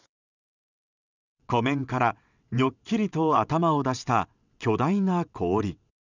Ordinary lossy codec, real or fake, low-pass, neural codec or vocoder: none; fake; 7.2 kHz; vocoder, 22.05 kHz, 80 mel bands, WaveNeXt